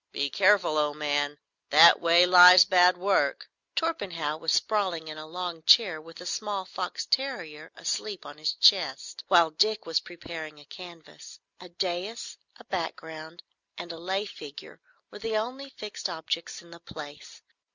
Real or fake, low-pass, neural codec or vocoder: real; 7.2 kHz; none